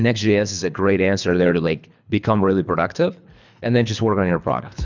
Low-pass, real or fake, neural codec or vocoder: 7.2 kHz; fake; codec, 24 kHz, 3 kbps, HILCodec